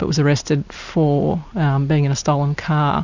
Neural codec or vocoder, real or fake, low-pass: none; real; 7.2 kHz